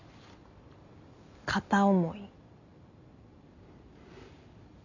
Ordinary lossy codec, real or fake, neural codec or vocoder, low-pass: none; real; none; 7.2 kHz